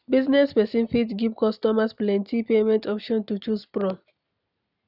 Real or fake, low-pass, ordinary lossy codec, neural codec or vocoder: real; 5.4 kHz; none; none